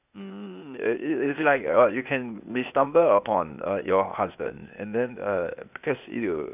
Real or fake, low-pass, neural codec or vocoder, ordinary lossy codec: fake; 3.6 kHz; codec, 16 kHz, 0.8 kbps, ZipCodec; none